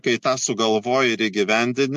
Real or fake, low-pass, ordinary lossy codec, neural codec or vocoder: real; 14.4 kHz; MP3, 64 kbps; none